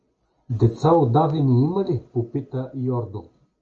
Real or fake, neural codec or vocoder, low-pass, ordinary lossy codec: real; none; 7.2 kHz; Opus, 24 kbps